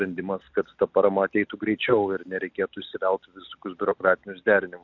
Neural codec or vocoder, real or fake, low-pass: none; real; 7.2 kHz